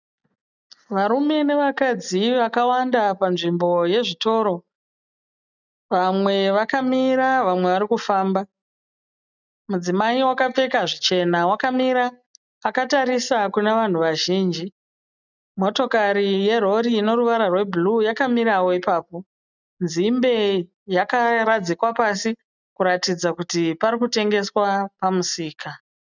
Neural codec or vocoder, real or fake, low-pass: none; real; 7.2 kHz